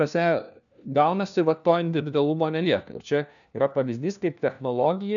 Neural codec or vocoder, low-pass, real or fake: codec, 16 kHz, 1 kbps, FunCodec, trained on LibriTTS, 50 frames a second; 7.2 kHz; fake